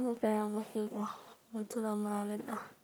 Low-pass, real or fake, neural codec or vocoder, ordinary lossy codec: none; fake; codec, 44.1 kHz, 1.7 kbps, Pupu-Codec; none